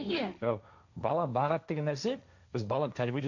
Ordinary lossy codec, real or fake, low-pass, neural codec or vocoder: none; fake; 7.2 kHz; codec, 16 kHz, 1.1 kbps, Voila-Tokenizer